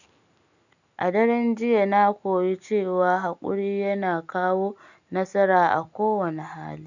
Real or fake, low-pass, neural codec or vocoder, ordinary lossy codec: real; 7.2 kHz; none; none